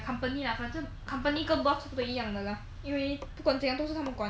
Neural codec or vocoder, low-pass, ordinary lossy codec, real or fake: none; none; none; real